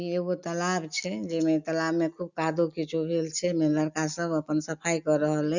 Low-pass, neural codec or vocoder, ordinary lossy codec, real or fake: 7.2 kHz; none; none; real